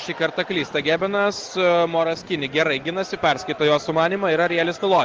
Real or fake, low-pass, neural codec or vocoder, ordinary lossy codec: real; 7.2 kHz; none; Opus, 16 kbps